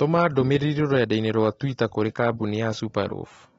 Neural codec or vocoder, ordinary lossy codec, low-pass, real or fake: none; AAC, 24 kbps; 19.8 kHz; real